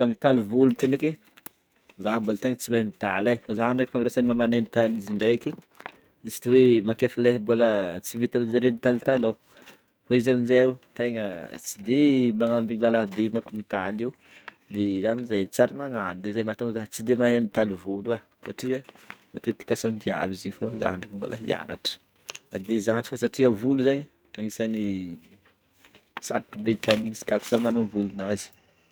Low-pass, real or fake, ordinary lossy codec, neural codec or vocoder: none; fake; none; codec, 44.1 kHz, 2.6 kbps, SNAC